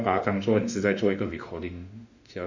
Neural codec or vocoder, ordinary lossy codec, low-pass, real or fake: autoencoder, 48 kHz, 32 numbers a frame, DAC-VAE, trained on Japanese speech; none; 7.2 kHz; fake